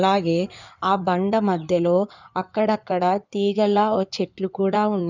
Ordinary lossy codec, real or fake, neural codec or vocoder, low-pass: none; fake; codec, 16 kHz in and 24 kHz out, 2.2 kbps, FireRedTTS-2 codec; 7.2 kHz